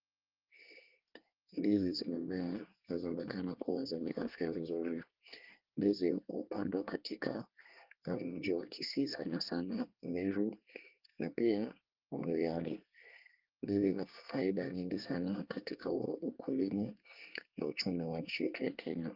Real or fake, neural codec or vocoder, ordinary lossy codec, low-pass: fake; codec, 24 kHz, 1 kbps, SNAC; Opus, 32 kbps; 5.4 kHz